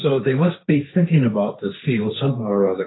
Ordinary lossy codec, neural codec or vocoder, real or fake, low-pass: AAC, 16 kbps; codec, 16 kHz, 1.1 kbps, Voila-Tokenizer; fake; 7.2 kHz